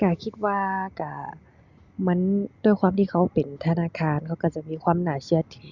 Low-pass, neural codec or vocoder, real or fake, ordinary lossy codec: 7.2 kHz; none; real; Opus, 64 kbps